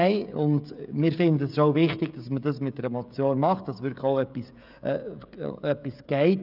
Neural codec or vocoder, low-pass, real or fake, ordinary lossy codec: codec, 16 kHz, 16 kbps, FreqCodec, smaller model; 5.4 kHz; fake; none